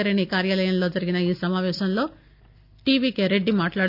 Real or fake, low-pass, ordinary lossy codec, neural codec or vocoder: real; 5.4 kHz; none; none